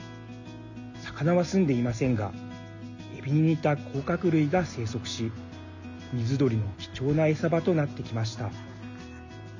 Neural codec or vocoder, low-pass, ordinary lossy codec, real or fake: none; 7.2 kHz; none; real